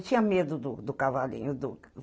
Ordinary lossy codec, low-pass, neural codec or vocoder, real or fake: none; none; none; real